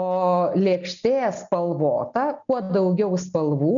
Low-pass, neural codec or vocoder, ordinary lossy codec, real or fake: 7.2 kHz; none; MP3, 96 kbps; real